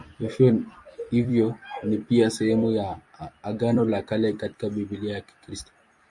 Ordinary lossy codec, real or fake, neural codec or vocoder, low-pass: MP3, 64 kbps; fake; vocoder, 44.1 kHz, 128 mel bands every 256 samples, BigVGAN v2; 10.8 kHz